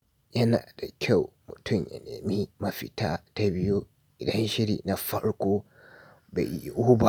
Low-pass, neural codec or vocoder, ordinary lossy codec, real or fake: none; none; none; real